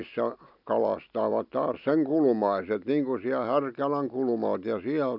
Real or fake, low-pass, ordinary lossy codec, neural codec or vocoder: real; 5.4 kHz; none; none